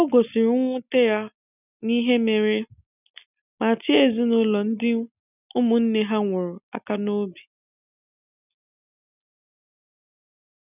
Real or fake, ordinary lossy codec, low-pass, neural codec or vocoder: real; none; 3.6 kHz; none